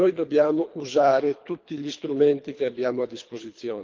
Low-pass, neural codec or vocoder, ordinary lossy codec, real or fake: 7.2 kHz; codec, 24 kHz, 3 kbps, HILCodec; Opus, 32 kbps; fake